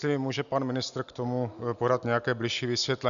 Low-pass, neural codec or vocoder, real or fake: 7.2 kHz; none; real